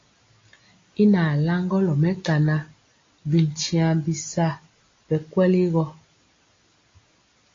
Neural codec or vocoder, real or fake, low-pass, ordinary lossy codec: none; real; 7.2 kHz; AAC, 32 kbps